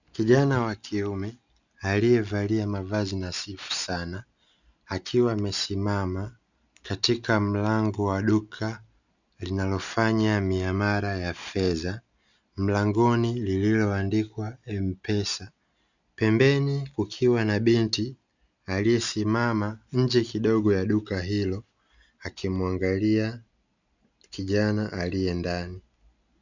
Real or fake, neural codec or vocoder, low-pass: real; none; 7.2 kHz